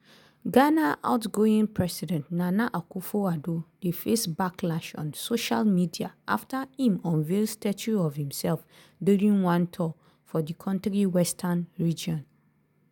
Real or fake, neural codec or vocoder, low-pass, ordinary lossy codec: real; none; none; none